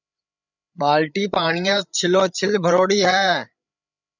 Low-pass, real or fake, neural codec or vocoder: 7.2 kHz; fake; codec, 16 kHz, 8 kbps, FreqCodec, larger model